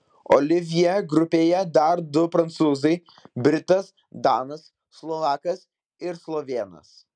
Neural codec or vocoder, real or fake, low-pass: none; real; 9.9 kHz